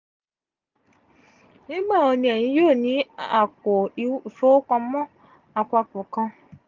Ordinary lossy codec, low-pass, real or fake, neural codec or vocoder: Opus, 24 kbps; 7.2 kHz; real; none